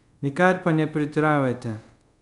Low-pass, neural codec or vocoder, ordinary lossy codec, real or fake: 10.8 kHz; codec, 24 kHz, 0.5 kbps, DualCodec; none; fake